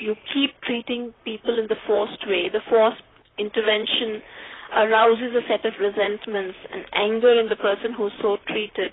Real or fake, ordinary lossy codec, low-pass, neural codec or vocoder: fake; AAC, 16 kbps; 7.2 kHz; vocoder, 44.1 kHz, 128 mel bands, Pupu-Vocoder